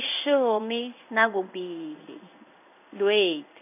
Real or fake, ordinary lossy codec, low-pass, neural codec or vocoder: fake; none; 3.6 kHz; codec, 16 kHz in and 24 kHz out, 1 kbps, XY-Tokenizer